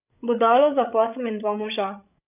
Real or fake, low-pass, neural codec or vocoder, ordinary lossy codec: fake; 3.6 kHz; codec, 16 kHz, 8 kbps, FreqCodec, larger model; none